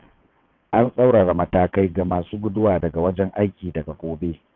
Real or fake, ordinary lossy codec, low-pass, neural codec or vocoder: fake; none; 7.2 kHz; vocoder, 22.05 kHz, 80 mel bands, WaveNeXt